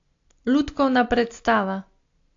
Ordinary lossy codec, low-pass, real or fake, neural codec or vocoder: AAC, 48 kbps; 7.2 kHz; real; none